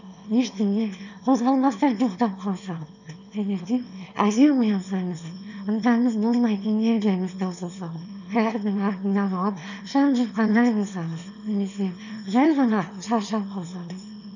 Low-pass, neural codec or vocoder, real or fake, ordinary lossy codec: 7.2 kHz; autoencoder, 22.05 kHz, a latent of 192 numbers a frame, VITS, trained on one speaker; fake; none